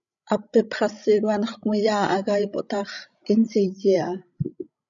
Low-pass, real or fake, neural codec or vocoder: 7.2 kHz; fake; codec, 16 kHz, 16 kbps, FreqCodec, larger model